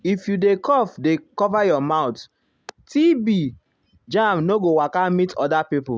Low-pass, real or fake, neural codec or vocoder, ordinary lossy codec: none; real; none; none